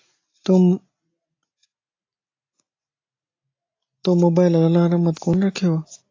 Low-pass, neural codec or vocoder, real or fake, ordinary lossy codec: 7.2 kHz; none; real; AAC, 32 kbps